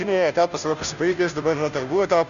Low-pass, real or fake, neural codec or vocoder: 7.2 kHz; fake; codec, 16 kHz, 0.5 kbps, FunCodec, trained on Chinese and English, 25 frames a second